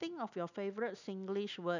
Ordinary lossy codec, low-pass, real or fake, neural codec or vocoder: none; 7.2 kHz; real; none